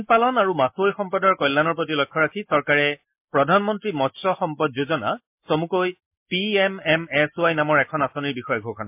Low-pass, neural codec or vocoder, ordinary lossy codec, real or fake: 3.6 kHz; none; MP3, 32 kbps; real